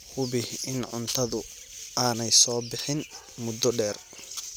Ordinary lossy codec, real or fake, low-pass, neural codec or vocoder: none; real; none; none